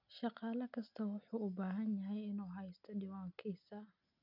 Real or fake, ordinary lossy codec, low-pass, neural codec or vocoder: real; none; 5.4 kHz; none